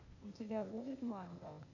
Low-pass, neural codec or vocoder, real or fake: 7.2 kHz; codec, 16 kHz, 0.8 kbps, ZipCodec; fake